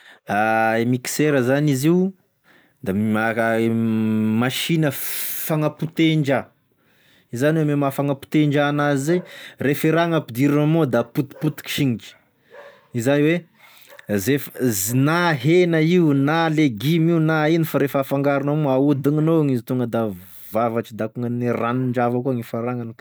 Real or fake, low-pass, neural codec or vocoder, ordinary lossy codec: real; none; none; none